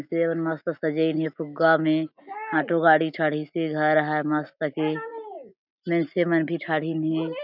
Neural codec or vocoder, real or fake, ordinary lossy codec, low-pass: none; real; none; 5.4 kHz